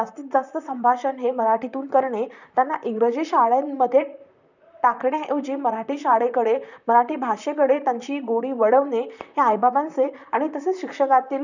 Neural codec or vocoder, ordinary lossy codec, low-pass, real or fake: vocoder, 44.1 kHz, 128 mel bands, Pupu-Vocoder; none; 7.2 kHz; fake